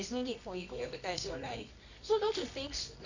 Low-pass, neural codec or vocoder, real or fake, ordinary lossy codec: 7.2 kHz; codec, 24 kHz, 0.9 kbps, WavTokenizer, medium music audio release; fake; none